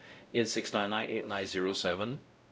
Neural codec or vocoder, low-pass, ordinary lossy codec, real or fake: codec, 16 kHz, 0.5 kbps, X-Codec, WavLM features, trained on Multilingual LibriSpeech; none; none; fake